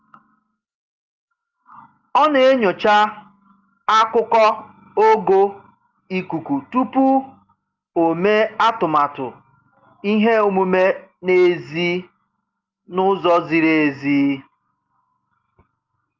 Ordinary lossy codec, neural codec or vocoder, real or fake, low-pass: Opus, 32 kbps; none; real; 7.2 kHz